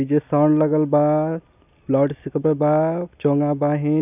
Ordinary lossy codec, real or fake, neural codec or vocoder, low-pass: none; real; none; 3.6 kHz